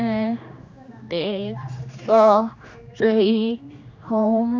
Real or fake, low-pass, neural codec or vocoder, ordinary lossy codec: fake; none; codec, 16 kHz, 2 kbps, X-Codec, HuBERT features, trained on general audio; none